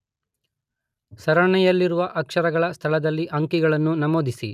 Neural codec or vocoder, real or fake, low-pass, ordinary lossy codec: none; real; 14.4 kHz; none